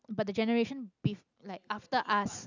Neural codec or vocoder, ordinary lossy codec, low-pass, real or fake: none; none; 7.2 kHz; real